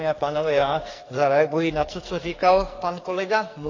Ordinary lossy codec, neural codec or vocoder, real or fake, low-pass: AAC, 32 kbps; codec, 44.1 kHz, 2.6 kbps, SNAC; fake; 7.2 kHz